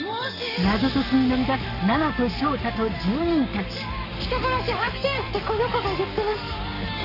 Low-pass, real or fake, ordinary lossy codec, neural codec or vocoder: 5.4 kHz; fake; none; codec, 44.1 kHz, 7.8 kbps, Pupu-Codec